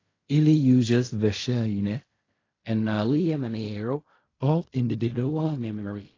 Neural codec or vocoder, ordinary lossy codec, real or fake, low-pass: codec, 16 kHz in and 24 kHz out, 0.4 kbps, LongCat-Audio-Codec, fine tuned four codebook decoder; AAC, 32 kbps; fake; 7.2 kHz